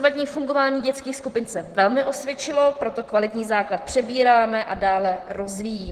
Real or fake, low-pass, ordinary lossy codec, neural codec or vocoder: fake; 14.4 kHz; Opus, 16 kbps; vocoder, 44.1 kHz, 128 mel bands, Pupu-Vocoder